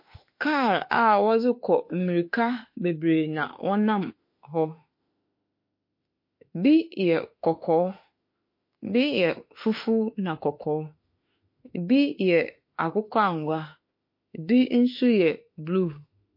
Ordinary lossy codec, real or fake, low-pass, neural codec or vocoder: MP3, 32 kbps; fake; 5.4 kHz; autoencoder, 48 kHz, 32 numbers a frame, DAC-VAE, trained on Japanese speech